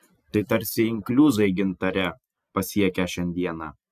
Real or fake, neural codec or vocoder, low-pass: real; none; 14.4 kHz